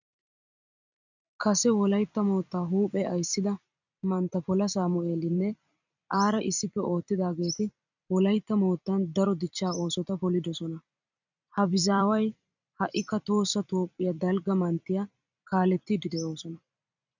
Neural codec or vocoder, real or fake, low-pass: vocoder, 44.1 kHz, 128 mel bands every 256 samples, BigVGAN v2; fake; 7.2 kHz